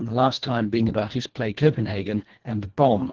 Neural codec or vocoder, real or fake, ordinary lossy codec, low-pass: codec, 24 kHz, 1.5 kbps, HILCodec; fake; Opus, 16 kbps; 7.2 kHz